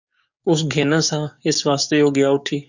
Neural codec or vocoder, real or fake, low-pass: codec, 44.1 kHz, 7.8 kbps, DAC; fake; 7.2 kHz